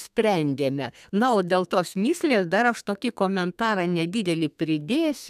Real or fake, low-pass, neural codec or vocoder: fake; 14.4 kHz; codec, 32 kHz, 1.9 kbps, SNAC